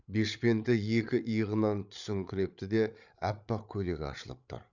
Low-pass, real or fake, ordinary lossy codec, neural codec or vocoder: 7.2 kHz; fake; none; codec, 16 kHz, 8 kbps, FreqCodec, larger model